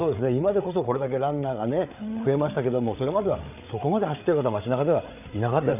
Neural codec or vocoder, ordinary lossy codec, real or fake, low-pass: codec, 16 kHz, 8 kbps, FreqCodec, larger model; none; fake; 3.6 kHz